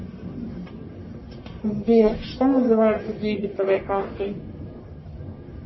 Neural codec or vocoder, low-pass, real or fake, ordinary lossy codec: codec, 44.1 kHz, 1.7 kbps, Pupu-Codec; 7.2 kHz; fake; MP3, 24 kbps